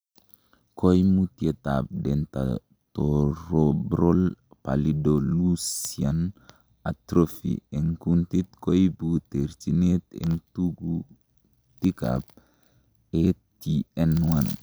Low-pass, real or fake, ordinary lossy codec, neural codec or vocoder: none; real; none; none